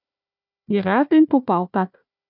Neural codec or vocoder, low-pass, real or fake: codec, 16 kHz, 1 kbps, FunCodec, trained on Chinese and English, 50 frames a second; 5.4 kHz; fake